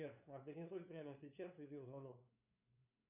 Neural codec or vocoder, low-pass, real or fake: codec, 16 kHz, 2 kbps, FunCodec, trained on LibriTTS, 25 frames a second; 3.6 kHz; fake